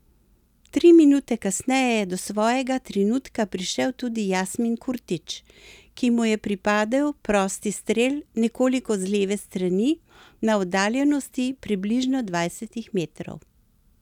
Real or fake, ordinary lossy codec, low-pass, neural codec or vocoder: real; none; 19.8 kHz; none